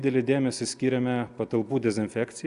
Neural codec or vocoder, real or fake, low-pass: none; real; 10.8 kHz